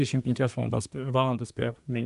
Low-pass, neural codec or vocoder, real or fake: 10.8 kHz; codec, 24 kHz, 1 kbps, SNAC; fake